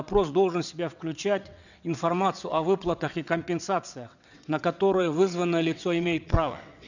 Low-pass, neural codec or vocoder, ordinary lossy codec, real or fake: 7.2 kHz; vocoder, 22.05 kHz, 80 mel bands, WaveNeXt; none; fake